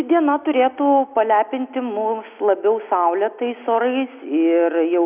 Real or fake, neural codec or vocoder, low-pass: real; none; 3.6 kHz